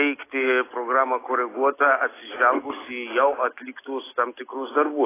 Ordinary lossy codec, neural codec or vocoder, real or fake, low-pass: AAC, 16 kbps; none; real; 3.6 kHz